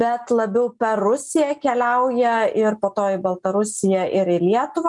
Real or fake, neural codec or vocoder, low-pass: real; none; 10.8 kHz